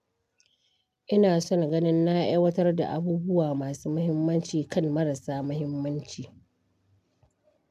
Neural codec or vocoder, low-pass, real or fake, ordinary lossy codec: none; 14.4 kHz; real; MP3, 96 kbps